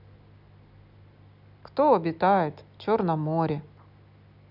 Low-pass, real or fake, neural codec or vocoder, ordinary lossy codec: 5.4 kHz; real; none; none